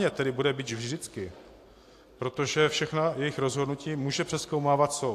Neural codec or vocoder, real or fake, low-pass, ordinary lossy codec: none; real; 14.4 kHz; AAC, 64 kbps